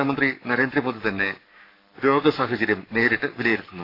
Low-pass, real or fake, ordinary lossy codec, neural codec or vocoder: 5.4 kHz; fake; AAC, 32 kbps; codec, 44.1 kHz, 7.8 kbps, Pupu-Codec